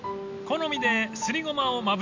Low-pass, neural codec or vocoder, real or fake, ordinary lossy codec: 7.2 kHz; none; real; MP3, 64 kbps